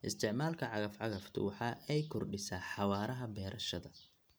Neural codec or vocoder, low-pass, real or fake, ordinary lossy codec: none; none; real; none